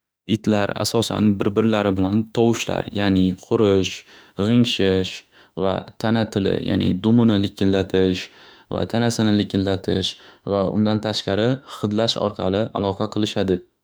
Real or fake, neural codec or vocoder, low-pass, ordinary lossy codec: fake; autoencoder, 48 kHz, 32 numbers a frame, DAC-VAE, trained on Japanese speech; none; none